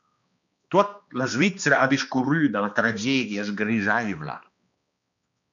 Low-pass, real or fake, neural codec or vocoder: 7.2 kHz; fake; codec, 16 kHz, 2 kbps, X-Codec, HuBERT features, trained on balanced general audio